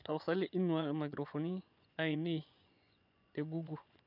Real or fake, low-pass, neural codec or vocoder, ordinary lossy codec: fake; 5.4 kHz; vocoder, 24 kHz, 100 mel bands, Vocos; none